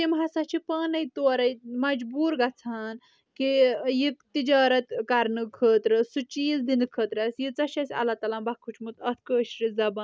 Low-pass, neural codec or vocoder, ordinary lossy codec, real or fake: none; none; none; real